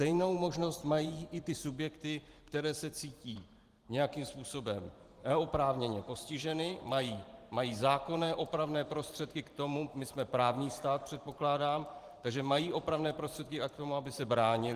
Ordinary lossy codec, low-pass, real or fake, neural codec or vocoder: Opus, 24 kbps; 14.4 kHz; fake; vocoder, 44.1 kHz, 128 mel bands every 512 samples, BigVGAN v2